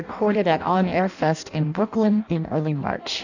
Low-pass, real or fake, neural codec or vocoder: 7.2 kHz; fake; codec, 16 kHz in and 24 kHz out, 0.6 kbps, FireRedTTS-2 codec